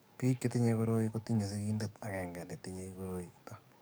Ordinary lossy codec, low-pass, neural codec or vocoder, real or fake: none; none; codec, 44.1 kHz, 7.8 kbps, DAC; fake